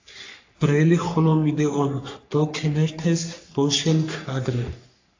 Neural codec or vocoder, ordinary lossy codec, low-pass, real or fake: codec, 44.1 kHz, 3.4 kbps, Pupu-Codec; AAC, 48 kbps; 7.2 kHz; fake